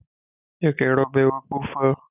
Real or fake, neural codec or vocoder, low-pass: real; none; 3.6 kHz